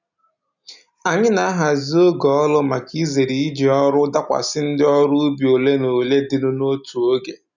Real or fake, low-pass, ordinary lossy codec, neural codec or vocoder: real; 7.2 kHz; none; none